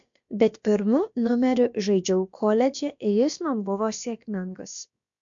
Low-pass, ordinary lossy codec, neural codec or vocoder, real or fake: 7.2 kHz; MP3, 64 kbps; codec, 16 kHz, about 1 kbps, DyCAST, with the encoder's durations; fake